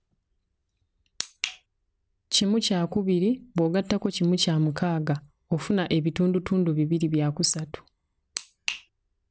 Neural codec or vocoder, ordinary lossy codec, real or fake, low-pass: none; none; real; none